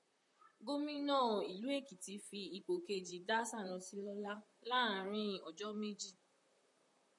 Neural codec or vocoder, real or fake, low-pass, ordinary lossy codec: vocoder, 44.1 kHz, 128 mel bands, Pupu-Vocoder; fake; 10.8 kHz; MP3, 96 kbps